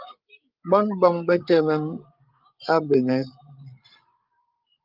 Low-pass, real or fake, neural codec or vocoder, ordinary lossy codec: 5.4 kHz; fake; codec, 16 kHz, 8 kbps, FreqCodec, larger model; Opus, 32 kbps